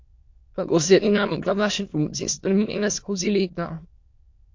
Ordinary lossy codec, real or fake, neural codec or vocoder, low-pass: MP3, 48 kbps; fake; autoencoder, 22.05 kHz, a latent of 192 numbers a frame, VITS, trained on many speakers; 7.2 kHz